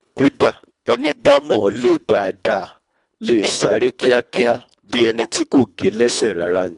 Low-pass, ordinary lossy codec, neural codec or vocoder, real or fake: 10.8 kHz; none; codec, 24 kHz, 1.5 kbps, HILCodec; fake